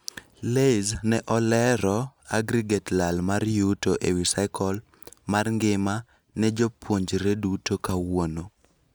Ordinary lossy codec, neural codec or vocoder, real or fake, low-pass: none; none; real; none